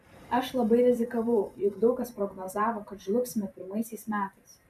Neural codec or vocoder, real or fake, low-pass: vocoder, 44.1 kHz, 128 mel bands every 512 samples, BigVGAN v2; fake; 14.4 kHz